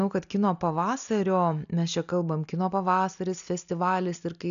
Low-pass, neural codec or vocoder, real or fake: 7.2 kHz; none; real